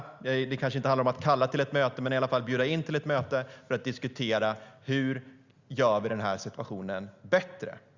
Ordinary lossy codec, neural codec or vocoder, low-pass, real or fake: Opus, 64 kbps; none; 7.2 kHz; real